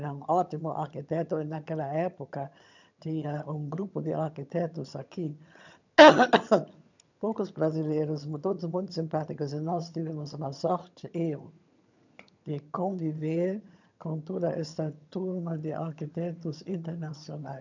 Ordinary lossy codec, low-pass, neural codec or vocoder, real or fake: none; 7.2 kHz; vocoder, 22.05 kHz, 80 mel bands, HiFi-GAN; fake